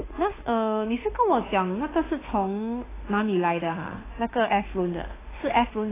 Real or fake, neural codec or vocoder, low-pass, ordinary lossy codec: fake; autoencoder, 48 kHz, 32 numbers a frame, DAC-VAE, trained on Japanese speech; 3.6 kHz; AAC, 16 kbps